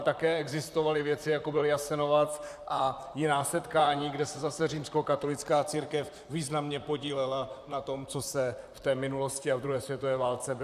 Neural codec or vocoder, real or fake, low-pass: vocoder, 44.1 kHz, 128 mel bands, Pupu-Vocoder; fake; 14.4 kHz